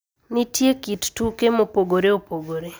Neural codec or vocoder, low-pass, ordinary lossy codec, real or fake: none; none; none; real